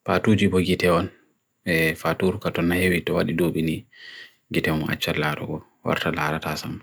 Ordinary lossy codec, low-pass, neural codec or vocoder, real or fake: none; none; none; real